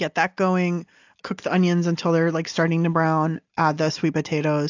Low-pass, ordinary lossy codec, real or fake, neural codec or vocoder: 7.2 kHz; AAC, 48 kbps; real; none